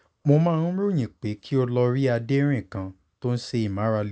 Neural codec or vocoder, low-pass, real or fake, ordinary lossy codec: none; none; real; none